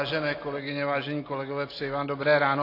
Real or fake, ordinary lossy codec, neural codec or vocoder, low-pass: real; AAC, 24 kbps; none; 5.4 kHz